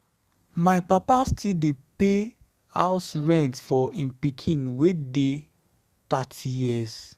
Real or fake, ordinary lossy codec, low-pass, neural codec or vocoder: fake; Opus, 64 kbps; 14.4 kHz; codec, 32 kHz, 1.9 kbps, SNAC